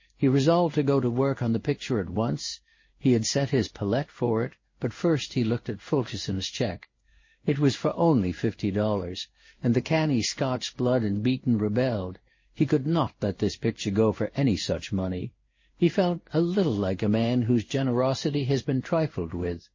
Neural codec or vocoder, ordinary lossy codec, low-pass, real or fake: codec, 16 kHz in and 24 kHz out, 1 kbps, XY-Tokenizer; MP3, 32 kbps; 7.2 kHz; fake